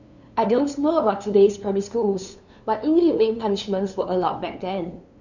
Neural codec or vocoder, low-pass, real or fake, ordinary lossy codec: codec, 16 kHz, 2 kbps, FunCodec, trained on LibriTTS, 25 frames a second; 7.2 kHz; fake; none